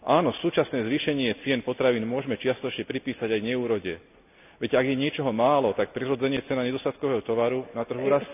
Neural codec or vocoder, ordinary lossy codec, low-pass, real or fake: none; none; 3.6 kHz; real